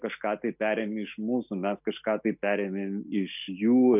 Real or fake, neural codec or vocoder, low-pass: real; none; 3.6 kHz